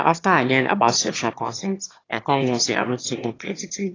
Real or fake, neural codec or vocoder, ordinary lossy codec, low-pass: fake; autoencoder, 22.05 kHz, a latent of 192 numbers a frame, VITS, trained on one speaker; AAC, 32 kbps; 7.2 kHz